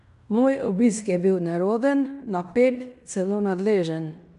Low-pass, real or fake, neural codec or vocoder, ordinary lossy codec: 10.8 kHz; fake; codec, 16 kHz in and 24 kHz out, 0.9 kbps, LongCat-Audio-Codec, fine tuned four codebook decoder; none